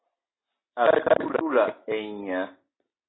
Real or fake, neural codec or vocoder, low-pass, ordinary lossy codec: real; none; 7.2 kHz; AAC, 16 kbps